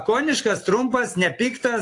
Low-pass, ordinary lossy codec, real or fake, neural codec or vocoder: 10.8 kHz; AAC, 48 kbps; real; none